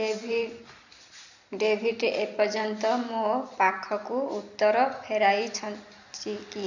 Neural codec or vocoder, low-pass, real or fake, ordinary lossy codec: vocoder, 44.1 kHz, 128 mel bands every 256 samples, BigVGAN v2; 7.2 kHz; fake; none